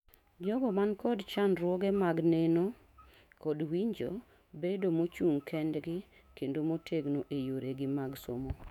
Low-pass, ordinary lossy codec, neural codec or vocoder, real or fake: 19.8 kHz; none; autoencoder, 48 kHz, 128 numbers a frame, DAC-VAE, trained on Japanese speech; fake